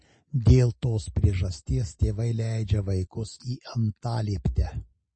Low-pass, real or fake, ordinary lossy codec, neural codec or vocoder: 9.9 kHz; real; MP3, 32 kbps; none